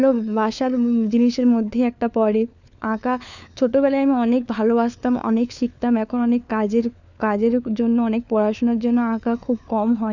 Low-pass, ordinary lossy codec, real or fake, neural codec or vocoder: 7.2 kHz; none; fake; codec, 16 kHz, 4 kbps, FunCodec, trained on LibriTTS, 50 frames a second